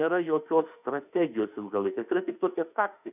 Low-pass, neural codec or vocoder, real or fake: 3.6 kHz; autoencoder, 48 kHz, 32 numbers a frame, DAC-VAE, trained on Japanese speech; fake